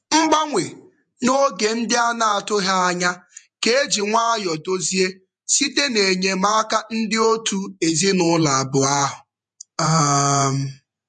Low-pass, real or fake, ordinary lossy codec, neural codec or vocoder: 10.8 kHz; fake; MP3, 64 kbps; vocoder, 48 kHz, 128 mel bands, Vocos